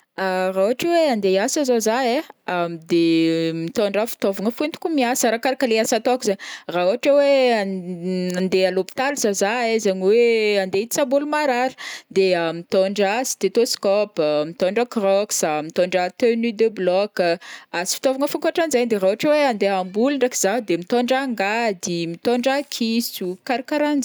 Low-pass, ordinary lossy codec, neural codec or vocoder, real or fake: none; none; none; real